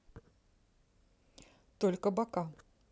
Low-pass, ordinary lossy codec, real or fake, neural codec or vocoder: none; none; real; none